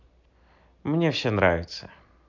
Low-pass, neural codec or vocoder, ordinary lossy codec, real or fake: 7.2 kHz; none; none; real